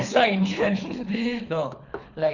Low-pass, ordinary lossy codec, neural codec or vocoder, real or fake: 7.2 kHz; none; codec, 24 kHz, 3 kbps, HILCodec; fake